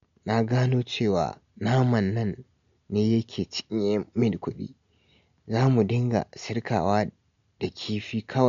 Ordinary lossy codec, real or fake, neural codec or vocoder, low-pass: MP3, 48 kbps; real; none; 7.2 kHz